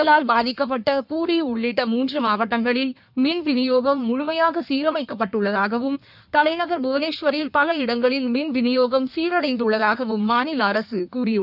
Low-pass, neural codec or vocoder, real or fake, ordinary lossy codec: 5.4 kHz; codec, 16 kHz in and 24 kHz out, 1.1 kbps, FireRedTTS-2 codec; fake; none